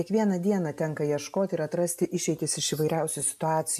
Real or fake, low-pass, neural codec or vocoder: real; 14.4 kHz; none